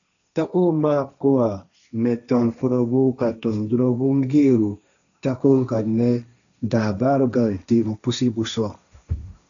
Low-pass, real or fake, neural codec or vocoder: 7.2 kHz; fake; codec, 16 kHz, 1.1 kbps, Voila-Tokenizer